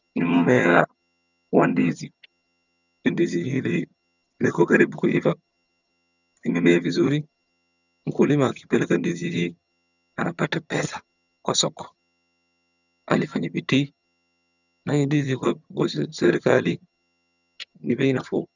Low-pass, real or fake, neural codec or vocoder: 7.2 kHz; fake; vocoder, 22.05 kHz, 80 mel bands, HiFi-GAN